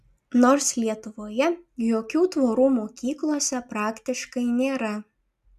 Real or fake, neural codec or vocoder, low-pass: real; none; 14.4 kHz